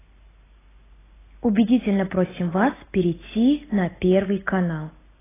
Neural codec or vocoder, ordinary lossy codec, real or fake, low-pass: none; AAC, 16 kbps; real; 3.6 kHz